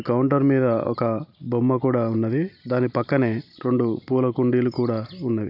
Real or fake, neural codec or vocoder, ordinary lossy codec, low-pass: real; none; none; 5.4 kHz